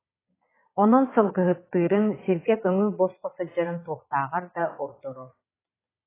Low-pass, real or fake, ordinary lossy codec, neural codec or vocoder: 3.6 kHz; fake; AAC, 16 kbps; vocoder, 22.05 kHz, 80 mel bands, Vocos